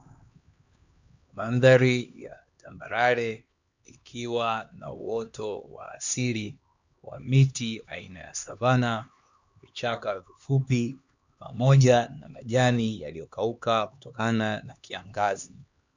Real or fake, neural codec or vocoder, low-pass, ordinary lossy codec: fake; codec, 16 kHz, 2 kbps, X-Codec, HuBERT features, trained on LibriSpeech; 7.2 kHz; Opus, 64 kbps